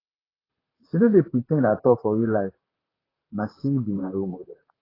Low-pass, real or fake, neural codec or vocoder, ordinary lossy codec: 5.4 kHz; fake; vocoder, 44.1 kHz, 128 mel bands, Pupu-Vocoder; AAC, 24 kbps